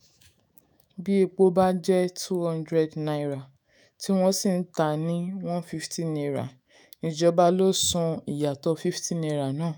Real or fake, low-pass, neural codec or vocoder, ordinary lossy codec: fake; none; autoencoder, 48 kHz, 128 numbers a frame, DAC-VAE, trained on Japanese speech; none